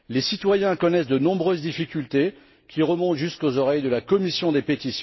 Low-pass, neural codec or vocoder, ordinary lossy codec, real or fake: 7.2 kHz; none; MP3, 24 kbps; real